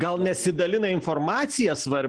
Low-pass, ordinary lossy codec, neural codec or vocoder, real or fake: 9.9 kHz; Opus, 16 kbps; none; real